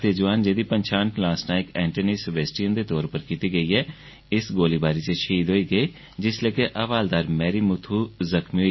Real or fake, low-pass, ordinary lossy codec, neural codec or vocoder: real; 7.2 kHz; MP3, 24 kbps; none